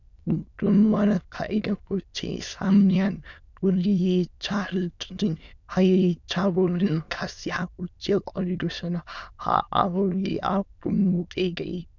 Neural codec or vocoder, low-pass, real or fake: autoencoder, 22.05 kHz, a latent of 192 numbers a frame, VITS, trained on many speakers; 7.2 kHz; fake